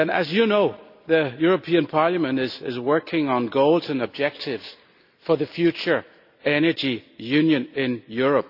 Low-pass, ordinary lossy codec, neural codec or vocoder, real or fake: 5.4 kHz; AAC, 48 kbps; none; real